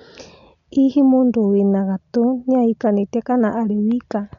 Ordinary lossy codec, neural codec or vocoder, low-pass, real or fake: MP3, 96 kbps; none; 7.2 kHz; real